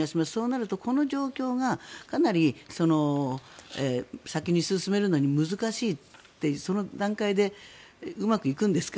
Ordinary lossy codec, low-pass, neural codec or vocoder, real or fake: none; none; none; real